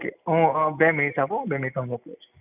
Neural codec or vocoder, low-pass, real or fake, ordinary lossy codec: none; 3.6 kHz; real; none